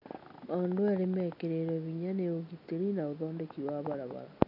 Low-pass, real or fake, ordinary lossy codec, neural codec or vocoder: 5.4 kHz; real; none; none